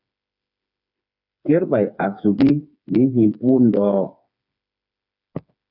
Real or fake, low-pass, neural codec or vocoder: fake; 5.4 kHz; codec, 16 kHz, 4 kbps, FreqCodec, smaller model